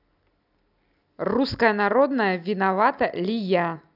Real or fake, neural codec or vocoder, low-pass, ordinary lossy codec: real; none; 5.4 kHz; none